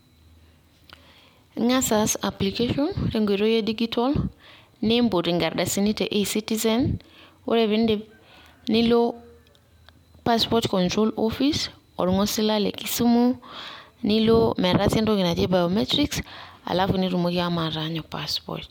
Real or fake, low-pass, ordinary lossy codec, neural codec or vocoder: real; 19.8 kHz; MP3, 96 kbps; none